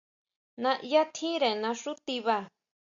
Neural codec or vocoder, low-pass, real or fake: none; 7.2 kHz; real